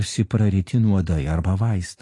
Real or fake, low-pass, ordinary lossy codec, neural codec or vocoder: real; 10.8 kHz; AAC, 48 kbps; none